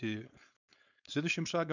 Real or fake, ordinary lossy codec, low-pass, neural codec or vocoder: fake; none; 7.2 kHz; codec, 16 kHz, 4.8 kbps, FACodec